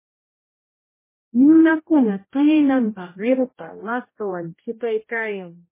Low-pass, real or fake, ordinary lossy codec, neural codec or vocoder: 3.6 kHz; fake; MP3, 16 kbps; codec, 16 kHz, 0.5 kbps, X-Codec, HuBERT features, trained on general audio